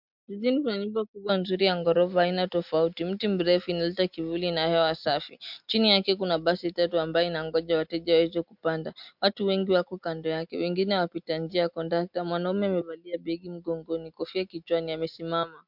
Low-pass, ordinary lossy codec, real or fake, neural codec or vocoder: 5.4 kHz; MP3, 48 kbps; real; none